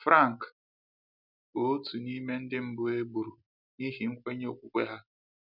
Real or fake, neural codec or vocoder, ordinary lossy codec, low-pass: real; none; none; 5.4 kHz